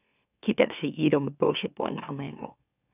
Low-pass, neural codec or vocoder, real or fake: 3.6 kHz; autoencoder, 44.1 kHz, a latent of 192 numbers a frame, MeloTTS; fake